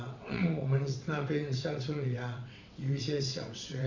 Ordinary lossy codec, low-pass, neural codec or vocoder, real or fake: none; 7.2 kHz; vocoder, 22.05 kHz, 80 mel bands, WaveNeXt; fake